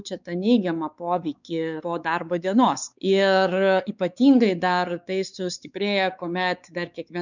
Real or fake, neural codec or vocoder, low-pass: fake; codec, 16 kHz, 4 kbps, X-Codec, WavLM features, trained on Multilingual LibriSpeech; 7.2 kHz